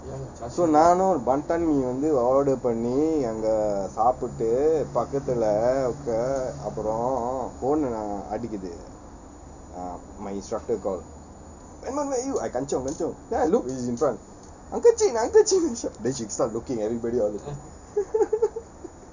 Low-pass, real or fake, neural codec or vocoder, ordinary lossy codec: 7.2 kHz; real; none; none